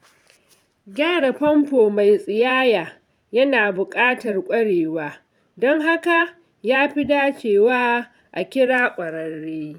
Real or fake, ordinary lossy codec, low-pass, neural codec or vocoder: fake; none; 19.8 kHz; vocoder, 44.1 kHz, 128 mel bands every 512 samples, BigVGAN v2